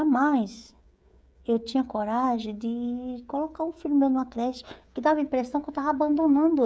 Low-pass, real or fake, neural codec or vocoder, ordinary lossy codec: none; fake; codec, 16 kHz, 16 kbps, FreqCodec, smaller model; none